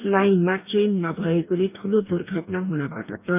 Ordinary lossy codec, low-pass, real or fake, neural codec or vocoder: none; 3.6 kHz; fake; codec, 44.1 kHz, 2.6 kbps, DAC